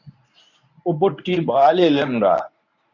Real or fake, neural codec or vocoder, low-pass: fake; codec, 24 kHz, 0.9 kbps, WavTokenizer, medium speech release version 1; 7.2 kHz